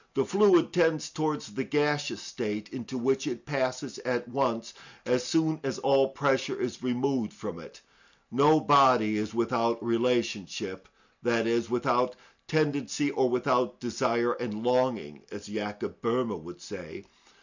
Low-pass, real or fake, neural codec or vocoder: 7.2 kHz; real; none